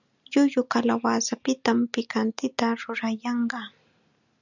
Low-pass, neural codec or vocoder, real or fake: 7.2 kHz; none; real